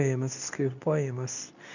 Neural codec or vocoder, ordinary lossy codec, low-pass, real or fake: codec, 24 kHz, 0.9 kbps, WavTokenizer, medium speech release version 2; none; 7.2 kHz; fake